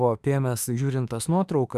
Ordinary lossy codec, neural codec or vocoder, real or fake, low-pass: Opus, 64 kbps; autoencoder, 48 kHz, 32 numbers a frame, DAC-VAE, trained on Japanese speech; fake; 14.4 kHz